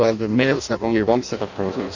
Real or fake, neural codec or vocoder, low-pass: fake; codec, 16 kHz in and 24 kHz out, 0.6 kbps, FireRedTTS-2 codec; 7.2 kHz